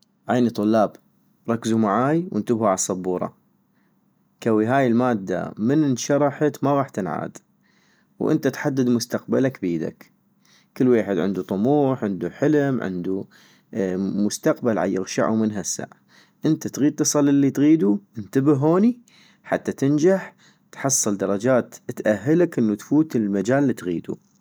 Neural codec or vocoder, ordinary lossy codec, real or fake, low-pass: none; none; real; none